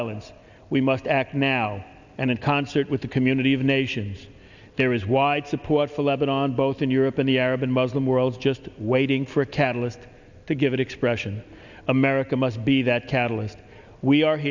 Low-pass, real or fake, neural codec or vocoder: 7.2 kHz; real; none